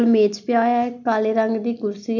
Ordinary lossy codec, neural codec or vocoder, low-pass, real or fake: none; none; 7.2 kHz; real